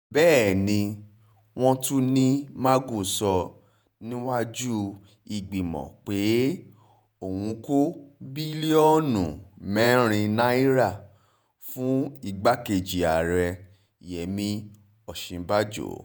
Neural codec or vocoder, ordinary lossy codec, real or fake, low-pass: vocoder, 48 kHz, 128 mel bands, Vocos; none; fake; none